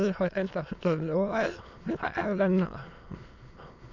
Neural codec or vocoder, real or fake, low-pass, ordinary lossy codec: autoencoder, 22.05 kHz, a latent of 192 numbers a frame, VITS, trained on many speakers; fake; 7.2 kHz; none